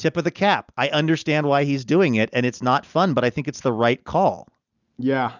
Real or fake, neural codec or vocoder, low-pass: real; none; 7.2 kHz